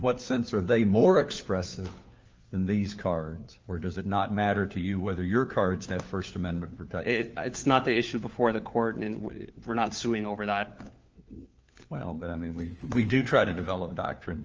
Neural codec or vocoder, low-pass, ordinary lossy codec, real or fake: codec, 16 kHz, 4 kbps, FunCodec, trained on LibriTTS, 50 frames a second; 7.2 kHz; Opus, 24 kbps; fake